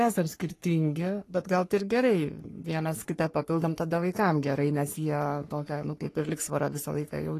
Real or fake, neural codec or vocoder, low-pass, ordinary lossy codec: fake; codec, 44.1 kHz, 3.4 kbps, Pupu-Codec; 14.4 kHz; AAC, 48 kbps